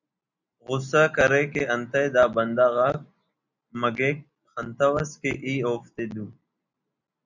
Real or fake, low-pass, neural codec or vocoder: real; 7.2 kHz; none